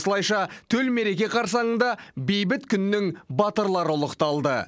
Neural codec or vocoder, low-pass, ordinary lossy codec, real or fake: none; none; none; real